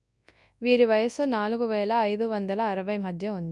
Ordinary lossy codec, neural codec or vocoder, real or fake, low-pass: none; codec, 24 kHz, 0.9 kbps, WavTokenizer, large speech release; fake; 10.8 kHz